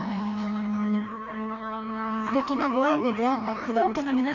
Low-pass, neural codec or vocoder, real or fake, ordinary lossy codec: 7.2 kHz; codec, 16 kHz, 1 kbps, FreqCodec, larger model; fake; AAC, 48 kbps